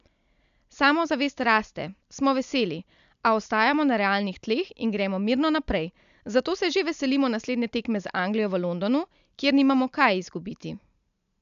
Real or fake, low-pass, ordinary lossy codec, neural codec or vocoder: real; 7.2 kHz; none; none